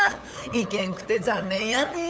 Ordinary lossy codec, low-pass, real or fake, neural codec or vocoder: none; none; fake; codec, 16 kHz, 8 kbps, FunCodec, trained on LibriTTS, 25 frames a second